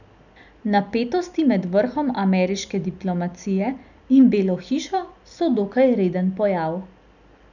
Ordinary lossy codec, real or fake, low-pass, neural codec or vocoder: none; real; 7.2 kHz; none